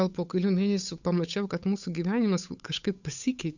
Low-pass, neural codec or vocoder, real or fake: 7.2 kHz; codec, 16 kHz, 8 kbps, FunCodec, trained on Chinese and English, 25 frames a second; fake